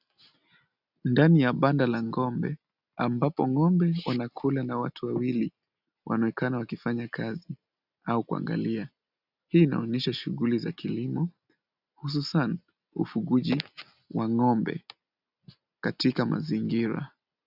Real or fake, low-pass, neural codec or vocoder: real; 5.4 kHz; none